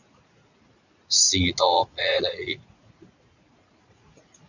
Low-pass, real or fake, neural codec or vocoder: 7.2 kHz; fake; vocoder, 44.1 kHz, 80 mel bands, Vocos